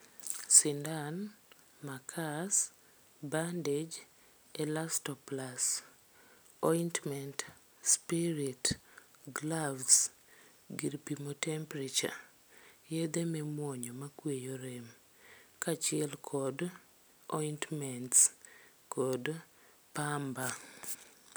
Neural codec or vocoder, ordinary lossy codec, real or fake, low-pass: none; none; real; none